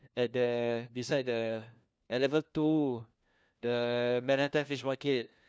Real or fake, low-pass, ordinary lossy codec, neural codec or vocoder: fake; none; none; codec, 16 kHz, 1 kbps, FunCodec, trained on LibriTTS, 50 frames a second